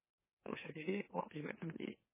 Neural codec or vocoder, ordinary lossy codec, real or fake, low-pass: autoencoder, 44.1 kHz, a latent of 192 numbers a frame, MeloTTS; MP3, 16 kbps; fake; 3.6 kHz